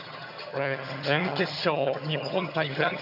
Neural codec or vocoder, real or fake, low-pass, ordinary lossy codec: vocoder, 22.05 kHz, 80 mel bands, HiFi-GAN; fake; 5.4 kHz; none